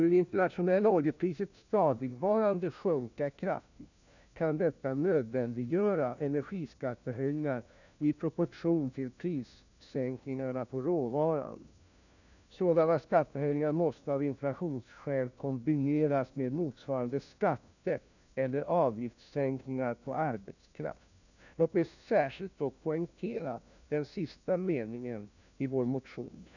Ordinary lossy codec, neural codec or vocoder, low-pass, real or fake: none; codec, 16 kHz, 1 kbps, FunCodec, trained on LibriTTS, 50 frames a second; 7.2 kHz; fake